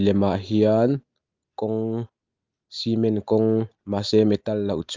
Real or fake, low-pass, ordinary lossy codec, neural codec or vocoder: real; 7.2 kHz; Opus, 32 kbps; none